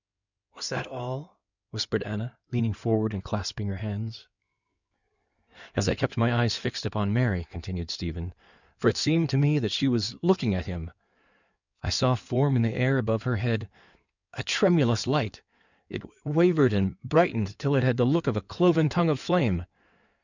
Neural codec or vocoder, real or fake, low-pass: codec, 16 kHz in and 24 kHz out, 2.2 kbps, FireRedTTS-2 codec; fake; 7.2 kHz